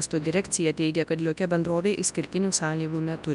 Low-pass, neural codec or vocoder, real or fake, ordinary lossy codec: 10.8 kHz; codec, 24 kHz, 0.9 kbps, WavTokenizer, large speech release; fake; MP3, 96 kbps